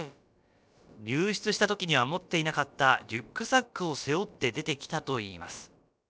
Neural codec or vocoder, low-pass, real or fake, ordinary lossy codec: codec, 16 kHz, about 1 kbps, DyCAST, with the encoder's durations; none; fake; none